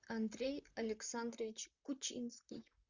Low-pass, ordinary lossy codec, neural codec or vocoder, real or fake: 7.2 kHz; Opus, 64 kbps; vocoder, 44.1 kHz, 128 mel bands, Pupu-Vocoder; fake